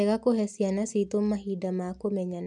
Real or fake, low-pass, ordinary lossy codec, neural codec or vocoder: real; 10.8 kHz; none; none